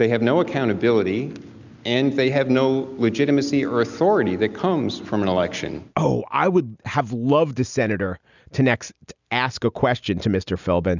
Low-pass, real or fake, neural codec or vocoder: 7.2 kHz; real; none